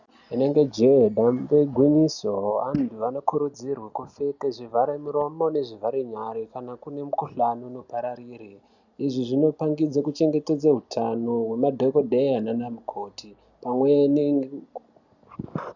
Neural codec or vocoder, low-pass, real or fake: none; 7.2 kHz; real